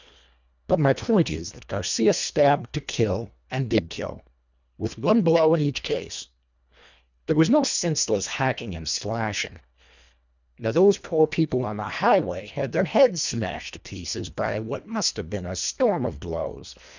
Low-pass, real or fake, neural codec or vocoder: 7.2 kHz; fake; codec, 24 kHz, 1.5 kbps, HILCodec